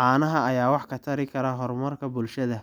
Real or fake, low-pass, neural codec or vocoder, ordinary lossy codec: real; none; none; none